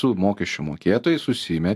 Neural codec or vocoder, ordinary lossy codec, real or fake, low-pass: none; AAC, 96 kbps; real; 14.4 kHz